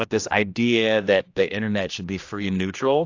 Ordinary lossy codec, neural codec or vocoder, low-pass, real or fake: AAC, 48 kbps; codec, 16 kHz, 1 kbps, X-Codec, HuBERT features, trained on general audio; 7.2 kHz; fake